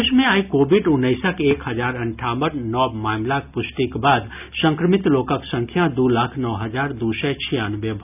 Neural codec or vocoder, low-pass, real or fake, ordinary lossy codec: none; 3.6 kHz; real; none